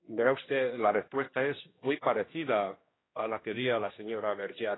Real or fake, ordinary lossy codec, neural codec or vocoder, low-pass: fake; AAC, 16 kbps; codec, 16 kHz, 1 kbps, X-Codec, HuBERT features, trained on general audio; 7.2 kHz